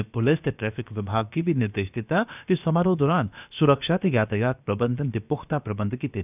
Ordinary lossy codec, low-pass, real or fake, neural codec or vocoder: none; 3.6 kHz; fake; codec, 16 kHz, about 1 kbps, DyCAST, with the encoder's durations